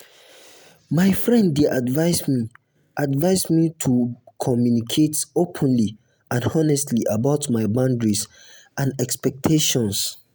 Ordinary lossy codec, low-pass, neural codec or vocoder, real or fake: none; none; none; real